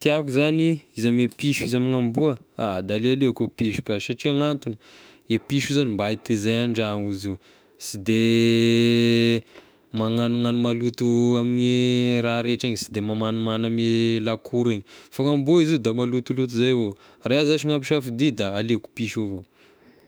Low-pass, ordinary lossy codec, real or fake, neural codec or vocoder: none; none; fake; autoencoder, 48 kHz, 32 numbers a frame, DAC-VAE, trained on Japanese speech